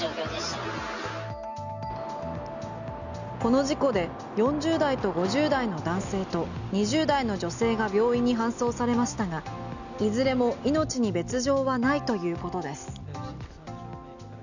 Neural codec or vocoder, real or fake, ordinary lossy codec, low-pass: none; real; none; 7.2 kHz